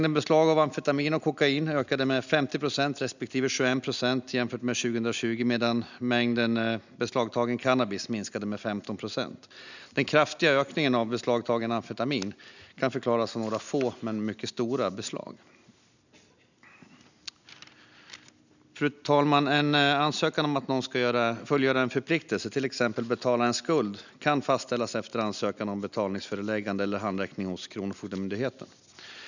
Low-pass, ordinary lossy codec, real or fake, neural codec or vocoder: 7.2 kHz; none; real; none